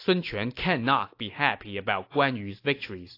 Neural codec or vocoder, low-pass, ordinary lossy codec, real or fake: none; 5.4 kHz; AAC, 32 kbps; real